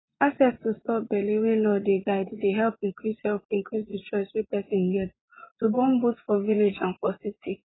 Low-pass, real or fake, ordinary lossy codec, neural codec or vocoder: 7.2 kHz; fake; AAC, 16 kbps; vocoder, 24 kHz, 100 mel bands, Vocos